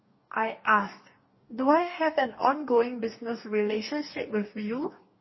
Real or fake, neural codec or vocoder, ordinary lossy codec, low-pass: fake; codec, 44.1 kHz, 2.6 kbps, DAC; MP3, 24 kbps; 7.2 kHz